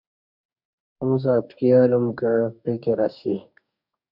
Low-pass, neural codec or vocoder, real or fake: 5.4 kHz; codec, 44.1 kHz, 2.6 kbps, DAC; fake